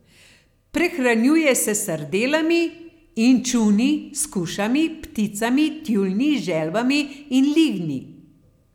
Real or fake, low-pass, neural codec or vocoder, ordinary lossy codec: real; 19.8 kHz; none; none